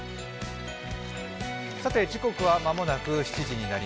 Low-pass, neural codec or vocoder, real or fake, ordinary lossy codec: none; none; real; none